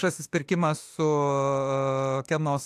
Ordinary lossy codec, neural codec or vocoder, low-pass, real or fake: AAC, 64 kbps; autoencoder, 48 kHz, 32 numbers a frame, DAC-VAE, trained on Japanese speech; 14.4 kHz; fake